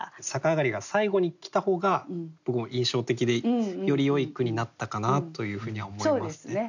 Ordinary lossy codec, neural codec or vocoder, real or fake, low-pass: none; none; real; 7.2 kHz